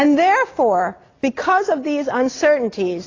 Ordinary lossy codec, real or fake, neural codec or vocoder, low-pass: AAC, 32 kbps; real; none; 7.2 kHz